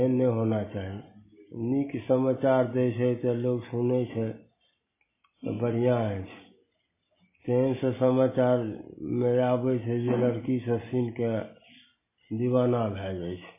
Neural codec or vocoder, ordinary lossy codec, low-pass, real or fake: none; MP3, 16 kbps; 3.6 kHz; real